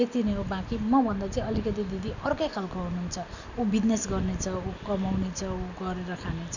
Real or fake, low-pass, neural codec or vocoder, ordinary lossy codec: real; 7.2 kHz; none; none